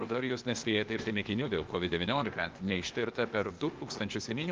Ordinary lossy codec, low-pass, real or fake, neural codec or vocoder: Opus, 32 kbps; 7.2 kHz; fake; codec, 16 kHz, 0.8 kbps, ZipCodec